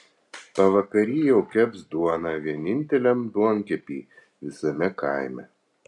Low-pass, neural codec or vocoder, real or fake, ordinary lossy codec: 10.8 kHz; none; real; MP3, 96 kbps